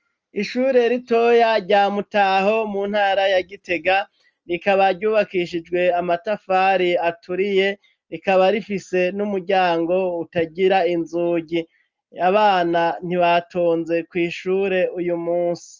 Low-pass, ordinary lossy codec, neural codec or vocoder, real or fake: 7.2 kHz; Opus, 24 kbps; none; real